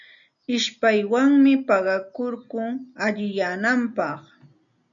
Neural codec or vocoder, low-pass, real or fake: none; 7.2 kHz; real